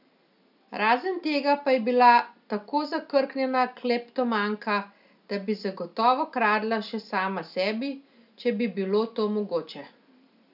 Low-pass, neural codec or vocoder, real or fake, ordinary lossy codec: 5.4 kHz; none; real; none